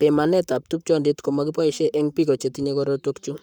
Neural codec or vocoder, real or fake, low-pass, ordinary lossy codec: codec, 44.1 kHz, 7.8 kbps, Pupu-Codec; fake; 19.8 kHz; none